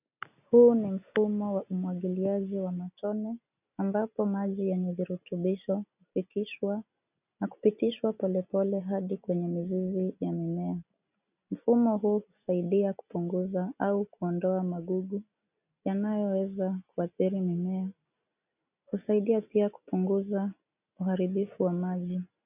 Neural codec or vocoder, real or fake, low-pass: none; real; 3.6 kHz